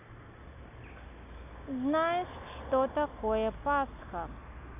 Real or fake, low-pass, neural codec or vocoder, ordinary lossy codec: real; 3.6 kHz; none; none